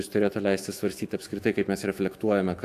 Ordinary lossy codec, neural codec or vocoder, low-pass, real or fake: AAC, 64 kbps; autoencoder, 48 kHz, 128 numbers a frame, DAC-VAE, trained on Japanese speech; 14.4 kHz; fake